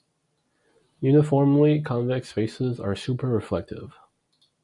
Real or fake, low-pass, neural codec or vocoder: real; 10.8 kHz; none